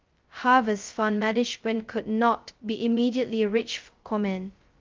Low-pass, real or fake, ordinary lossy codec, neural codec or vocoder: 7.2 kHz; fake; Opus, 32 kbps; codec, 16 kHz, 0.2 kbps, FocalCodec